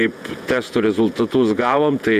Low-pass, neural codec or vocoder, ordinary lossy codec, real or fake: 14.4 kHz; vocoder, 48 kHz, 128 mel bands, Vocos; MP3, 96 kbps; fake